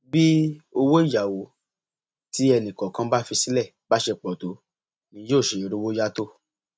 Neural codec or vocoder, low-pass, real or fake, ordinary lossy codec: none; none; real; none